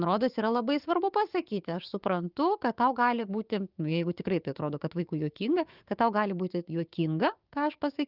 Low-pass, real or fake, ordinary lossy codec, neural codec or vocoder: 5.4 kHz; fake; Opus, 32 kbps; codec, 16 kHz, 6 kbps, DAC